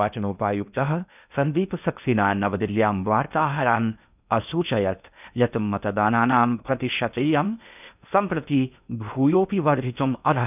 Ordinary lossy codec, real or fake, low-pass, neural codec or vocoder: none; fake; 3.6 kHz; codec, 16 kHz in and 24 kHz out, 0.6 kbps, FocalCodec, streaming, 2048 codes